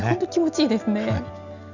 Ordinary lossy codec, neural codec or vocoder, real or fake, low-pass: none; none; real; 7.2 kHz